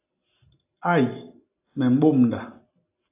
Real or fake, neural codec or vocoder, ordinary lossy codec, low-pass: real; none; AAC, 32 kbps; 3.6 kHz